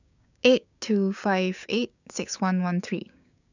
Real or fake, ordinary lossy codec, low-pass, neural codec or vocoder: fake; none; 7.2 kHz; codec, 16 kHz, 6 kbps, DAC